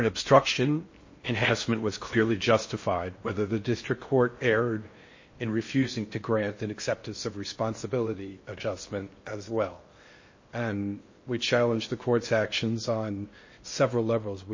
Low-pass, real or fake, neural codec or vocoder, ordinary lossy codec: 7.2 kHz; fake; codec, 16 kHz in and 24 kHz out, 0.6 kbps, FocalCodec, streaming, 4096 codes; MP3, 32 kbps